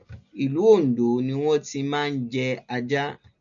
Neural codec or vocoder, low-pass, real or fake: none; 7.2 kHz; real